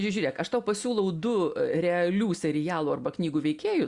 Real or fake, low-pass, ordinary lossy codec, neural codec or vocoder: real; 10.8 kHz; Opus, 64 kbps; none